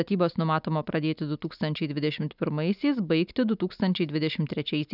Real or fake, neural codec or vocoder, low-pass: real; none; 5.4 kHz